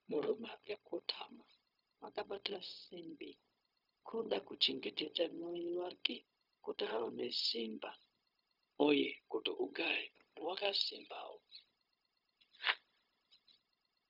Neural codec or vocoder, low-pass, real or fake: codec, 16 kHz, 0.4 kbps, LongCat-Audio-Codec; 5.4 kHz; fake